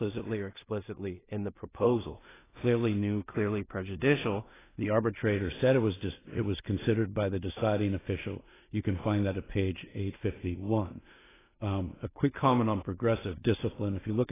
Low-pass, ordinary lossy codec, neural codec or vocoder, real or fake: 3.6 kHz; AAC, 16 kbps; codec, 16 kHz in and 24 kHz out, 0.4 kbps, LongCat-Audio-Codec, two codebook decoder; fake